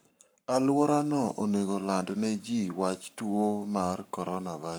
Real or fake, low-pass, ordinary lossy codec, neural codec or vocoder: fake; none; none; codec, 44.1 kHz, 7.8 kbps, Pupu-Codec